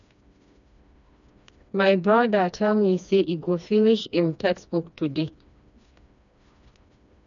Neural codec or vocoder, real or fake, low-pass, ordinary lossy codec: codec, 16 kHz, 2 kbps, FreqCodec, smaller model; fake; 7.2 kHz; none